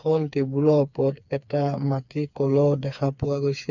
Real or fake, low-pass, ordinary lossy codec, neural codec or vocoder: fake; 7.2 kHz; none; codec, 16 kHz, 4 kbps, FreqCodec, smaller model